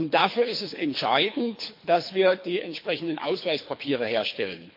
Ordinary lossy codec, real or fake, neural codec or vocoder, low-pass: MP3, 32 kbps; fake; codec, 24 kHz, 3 kbps, HILCodec; 5.4 kHz